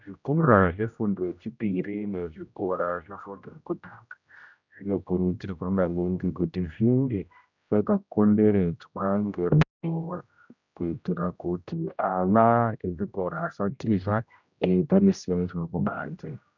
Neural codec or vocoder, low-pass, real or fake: codec, 16 kHz, 0.5 kbps, X-Codec, HuBERT features, trained on general audio; 7.2 kHz; fake